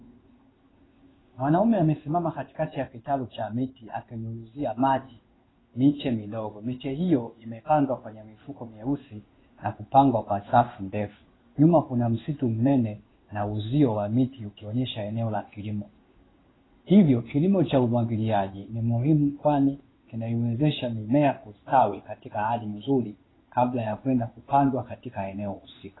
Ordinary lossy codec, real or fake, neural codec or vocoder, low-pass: AAC, 16 kbps; fake; codec, 16 kHz in and 24 kHz out, 1 kbps, XY-Tokenizer; 7.2 kHz